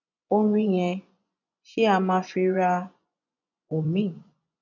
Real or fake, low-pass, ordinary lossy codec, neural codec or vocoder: fake; 7.2 kHz; none; vocoder, 24 kHz, 100 mel bands, Vocos